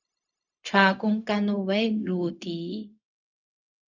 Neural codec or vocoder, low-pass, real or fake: codec, 16 kHz, 0.4 kbps, LongCat-Audio-Codec; 7.2 kHz; fake